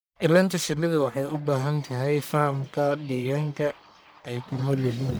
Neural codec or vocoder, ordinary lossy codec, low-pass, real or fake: codec, 44.1 kHz, 1.7 kbps, Pupu-Codec; none; none; fake